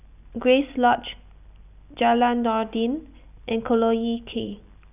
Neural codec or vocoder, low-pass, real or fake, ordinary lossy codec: none; 3.6 kHz; real; none